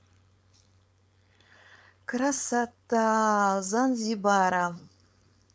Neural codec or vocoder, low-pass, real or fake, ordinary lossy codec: codec, 16 kHz, 4.8 kbps, FACodec; none; fake; none